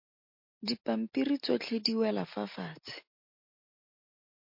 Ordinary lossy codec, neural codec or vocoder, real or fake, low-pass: MP3, 32 kbps; none; real; 5.4 kHz